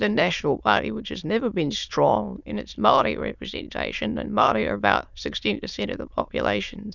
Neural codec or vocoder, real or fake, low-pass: autoencoder, 22.05 kHz, a latent of 192 numbers a frame, VITS, trained on many speakers; fake; 7.2 kHz